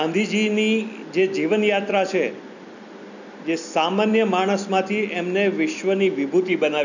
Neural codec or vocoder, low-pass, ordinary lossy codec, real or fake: none; 7.2 kHz; none; real